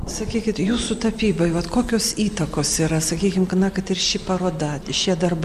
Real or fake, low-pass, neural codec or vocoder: real; 14.4 kHz; none